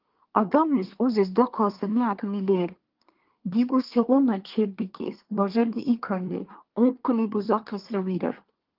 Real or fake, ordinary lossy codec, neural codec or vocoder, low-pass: fake; Opus, 32 kbps; codec, 24 kHz, 1 kbps, SNAC; 5.4 kHz